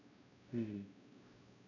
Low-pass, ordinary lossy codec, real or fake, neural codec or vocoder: 7.2 kHz; none; fake; codec, 16 kHz, 1 kbps, X-Codec, WavLM features, trained on Multilingual LibriSpeech